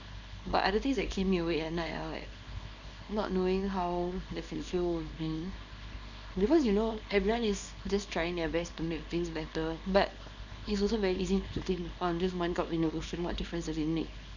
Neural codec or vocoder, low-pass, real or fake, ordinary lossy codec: codec, 24 kHz, 0.9 kbps, WavTokenizer, small release; 7.2 kHz; fake; none